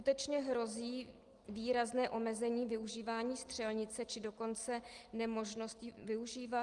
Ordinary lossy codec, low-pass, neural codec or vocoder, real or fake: Opus, 24 kbps; 10.8 kHz; none; real